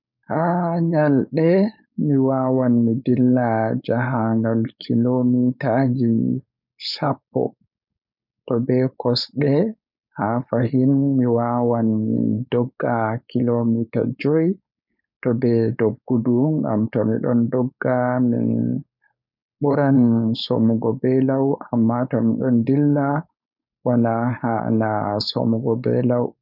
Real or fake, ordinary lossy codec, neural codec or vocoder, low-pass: fake; none; codec, 16 kHz, 4.8 kbps, FACodec; 5.4 kHz